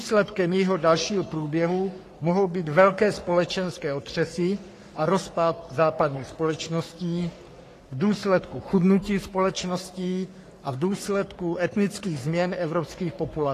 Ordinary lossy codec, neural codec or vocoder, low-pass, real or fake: AAC, 48 kbps; codec, 44.1 kHz, 3.4 kbps, Pupu-Codec; 14.4 kHz; fake